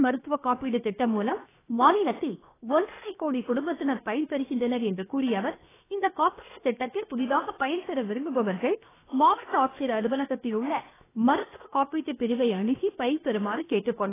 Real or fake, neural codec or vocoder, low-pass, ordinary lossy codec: fake; codec, 16 kHz, 0.7 kbps, FocalCodec; 3.6 kHz; AAC, 16 kbps